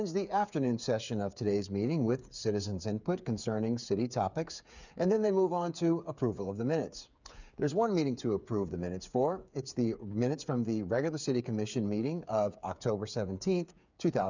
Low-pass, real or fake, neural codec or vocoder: 7.2 kHz; fake; codec, 16 kHz, 8 kbps, FreqCodec, smaller model